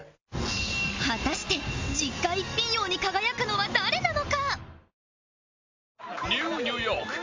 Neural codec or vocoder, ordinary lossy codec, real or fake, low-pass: none; MP3, 64 kbps; real; 7.2 kHz